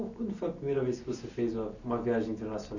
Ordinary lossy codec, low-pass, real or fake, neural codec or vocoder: none; 7.2 kHz; real; none